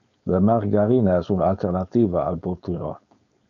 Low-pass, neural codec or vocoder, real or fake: 7.2 kHz; codec, 16 kHz, 4.8 kbps, FACodec; fake